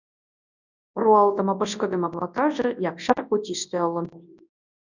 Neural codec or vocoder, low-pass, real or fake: codec, 24 kHz, 0.9 kbps, WavTokenizer, large speech release; 7.2 kHz; fake